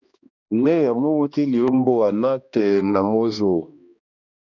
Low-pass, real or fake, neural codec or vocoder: 7.2 kHz; fake; codec, 16 kHz, 1 kbps, X-Codec, HuBERT features, trained on balanced general audio